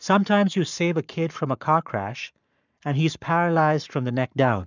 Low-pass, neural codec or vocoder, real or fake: 7.2 kHz; codec, 44.1 kHz, 7.8 kbps, Pupu-Codec; fake